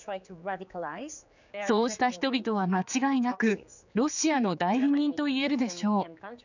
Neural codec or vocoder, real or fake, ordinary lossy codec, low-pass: codec, 16 kHz, 4 kbps, X-Codec, HuBERT features, trained on general audio; fake; none; 7.2 kHz